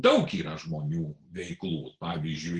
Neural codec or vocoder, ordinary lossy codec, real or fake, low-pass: none; Opus, 16 kbps; real; 9.9 kHz